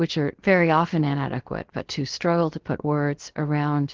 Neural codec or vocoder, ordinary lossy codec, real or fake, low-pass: codec, 16 kHz, 0.7 kbps, FocalCodec; Opus, 16 kbps; fake; 7.2 kHz